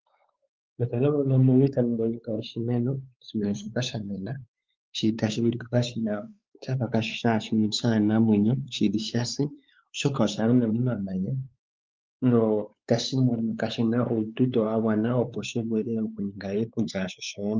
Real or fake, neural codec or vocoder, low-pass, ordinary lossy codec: fake; codec, 16 kHz, 4 kbps, X-Codec, WavLM features, trained on Multilingual LibriSpeech; 7.2 kHz; Opus, 24 kbps